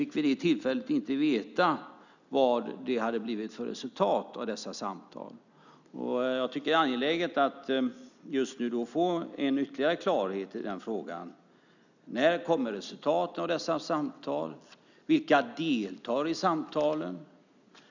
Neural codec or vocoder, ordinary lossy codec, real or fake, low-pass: none; none; real; 7.2 kHz